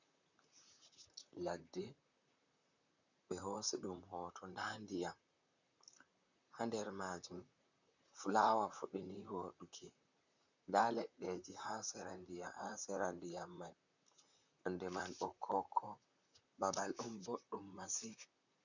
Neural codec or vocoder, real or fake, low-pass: vocoder, 44.1 kHz, 128 mel bands, Pupu-Vocoder; fake; 7.2 kHz